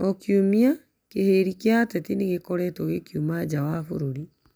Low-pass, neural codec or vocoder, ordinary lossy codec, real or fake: none; none; none; real